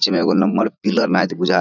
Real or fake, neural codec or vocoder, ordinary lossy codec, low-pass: fake; vocoder, 44.1 kHz, 80 mel bands, Vocos; none; 7.2 kHz